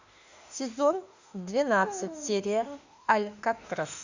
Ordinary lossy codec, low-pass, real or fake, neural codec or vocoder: Opus, 64 kbps; 7.2 kHz; fake; autoencoder, 48 kHz, 32 numbers a frame, DAC-VAE, trained on Japanese speech